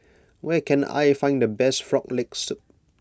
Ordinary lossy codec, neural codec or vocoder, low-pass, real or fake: none; none; none; real